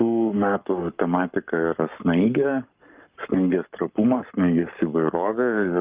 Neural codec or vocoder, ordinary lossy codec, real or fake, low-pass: codec, 44.1 kHz, 7.8 kbps, Pupu-Codec; Opus, 24 kbps; fake; 3.6 kHz